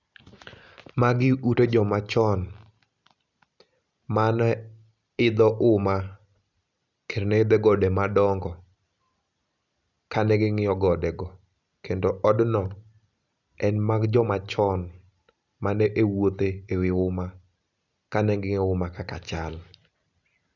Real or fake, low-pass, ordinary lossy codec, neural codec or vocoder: real; 7.2 kHz; none; none